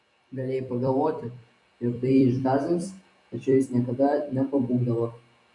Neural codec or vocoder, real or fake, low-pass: vocoder, 44.1 kHz, 128 mel bands every 256 samples, BigVGAN v2; fake; 10.8 kHz